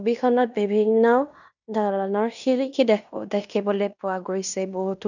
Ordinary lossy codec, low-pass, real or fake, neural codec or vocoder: none; 7.2 kHz; fake; codec, 16 kHz in and 24 kHz out, 0.9 kbps, LongCat-Audio-Codec, fine tuned four codebook decoder